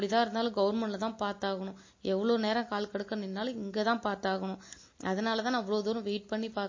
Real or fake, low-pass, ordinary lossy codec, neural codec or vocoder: real; 7.2 kHz; MP3, 32 kbps; none